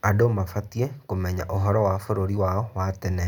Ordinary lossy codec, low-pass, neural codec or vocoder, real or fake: none; 19.8 kHz; none; real